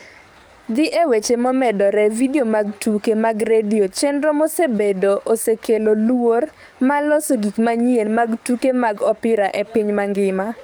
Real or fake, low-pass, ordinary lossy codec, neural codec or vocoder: fake; none; none; codec, 44.1 kHz, 7.8 kbps, DAC